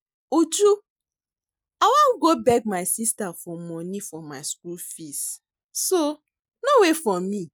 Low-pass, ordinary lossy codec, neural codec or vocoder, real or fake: none; none; none; real